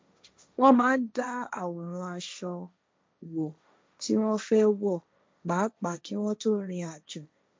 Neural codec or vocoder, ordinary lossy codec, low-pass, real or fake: codec, 16 kHz, 1.1 kbps, Voila-Tokenizer; none; none; fake